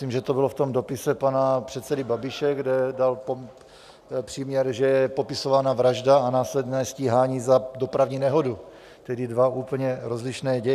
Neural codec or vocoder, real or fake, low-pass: none; real; 14.4 kHz